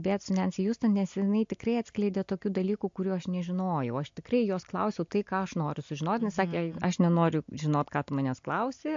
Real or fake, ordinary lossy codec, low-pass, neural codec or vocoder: real; MP3, 48 kbps; 7.2 kHz; none